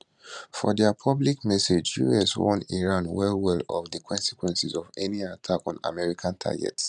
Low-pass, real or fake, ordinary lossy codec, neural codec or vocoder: 10.8 kHz; fake; none; vocoder, 44.1 kHz, 128 mel bands every 512 samples, BigVGAN v2